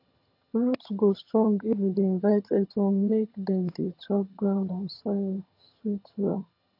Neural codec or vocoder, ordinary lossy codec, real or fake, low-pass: vocoder, 22.05 kHz, 80 mel bands, HiFi-GAN; AAC, 32 kbps; fake; 5.4 kHz